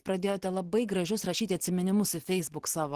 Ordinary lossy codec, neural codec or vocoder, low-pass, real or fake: Opus, 16 kbps; vocoder, 44.1 kHz, 128 mel bands every 512 samples, BigVGAN v2; 14.4 kHz; fake